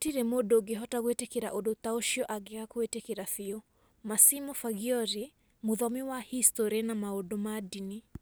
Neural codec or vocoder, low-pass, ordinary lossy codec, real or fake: none; none; none; real